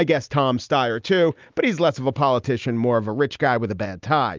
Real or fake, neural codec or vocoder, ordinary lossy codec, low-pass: real; none; Opus, 24 kbps; 7.2 kHz